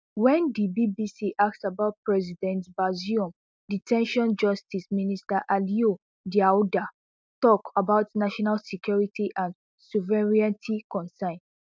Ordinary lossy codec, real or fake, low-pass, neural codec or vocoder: none; real; none; none